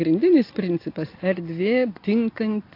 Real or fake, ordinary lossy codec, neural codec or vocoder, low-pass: real; AAC, 32 kbps; none; 5.4 kHz